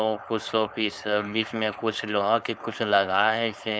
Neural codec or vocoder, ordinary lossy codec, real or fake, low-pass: codec, 16 kHz, 4.8 kbps, FACodec; none; fake; none